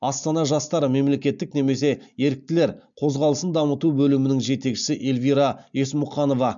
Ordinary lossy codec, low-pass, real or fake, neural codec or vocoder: MP3, 64 kbps; 7.2 kHz; real; none